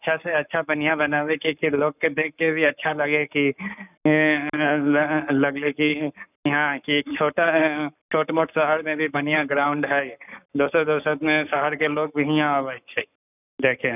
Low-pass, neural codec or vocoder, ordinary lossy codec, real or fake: 3.6 kHz; codec, 44.1 kHz, 7.8 kbps, Pupu-Codec; none; fake